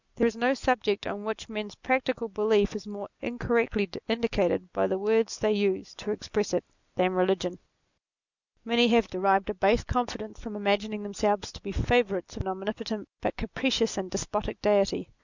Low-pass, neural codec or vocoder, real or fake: 7.2 kHz; none; real